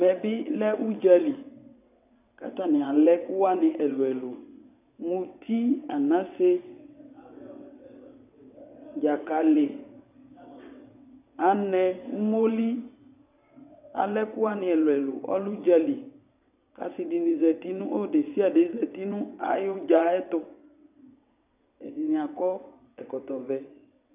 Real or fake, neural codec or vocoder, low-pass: fake; vocoder, 22.05 kHz, 80 mel bands, WaveNeXt; 3.6 kHz